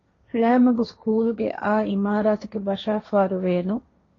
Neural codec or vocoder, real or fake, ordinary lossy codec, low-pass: codec, 16 kHz, 1.1 kbps, Voila-Tokenizer; fake; AAC, 32 kbps; 7.2 kHz